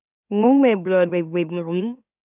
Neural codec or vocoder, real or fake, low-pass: autoencoder, 44.1 kHz, a latent of 192 numbers a frame, MeloTTS; fake; 3.6 kHz